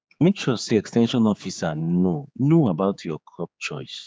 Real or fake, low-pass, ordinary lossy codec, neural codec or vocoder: fake; none; none; codec, 16 kHz, 4 kbps, X-Codec, HuBERT features, trained on general audio